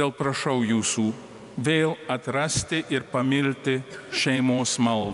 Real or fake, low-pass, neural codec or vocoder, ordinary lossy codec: fake; 10.8 kHz; vocoder, 24 kHz, 100 mel bands, Vocos; MP3, 96 kbps